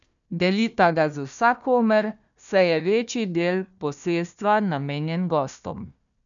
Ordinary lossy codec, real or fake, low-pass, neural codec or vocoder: none; fake; 7.2 kHz; codec, 16 kHz, 1 kbps, FunCodec, trained on Chinese and English, 50 frames a second